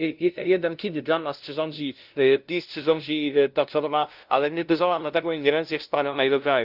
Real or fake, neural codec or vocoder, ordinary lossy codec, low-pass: fake; codec, 16 kHz, 0.5 kbps, FunCodec, trained on LibriTTS, 25 frames a second; Opus, 32 kbps; 5.4 kHz